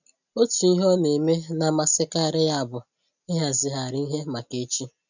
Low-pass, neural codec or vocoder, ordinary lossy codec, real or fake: 7.2 kHz; none; none; real